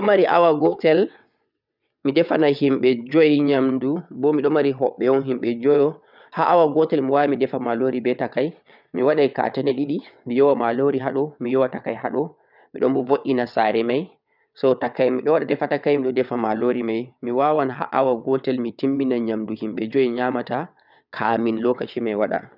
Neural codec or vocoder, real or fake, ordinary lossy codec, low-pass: vocoder, 22.05 kHz, 80 mel bands, WaveNeXt; fake; none; 5.4 kHz